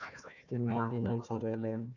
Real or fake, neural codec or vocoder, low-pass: fake; codec, 16 kHz, 1 kbps, FunCodec, trained on Chinese and English, 50 frames a second; 7.2 kHz